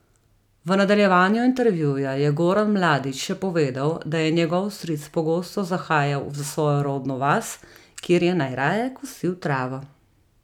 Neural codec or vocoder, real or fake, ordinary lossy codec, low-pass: none; real; none; 19.8 kHz